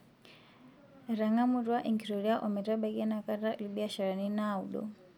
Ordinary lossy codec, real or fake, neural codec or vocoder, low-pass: none; real; none; 19.8 kHz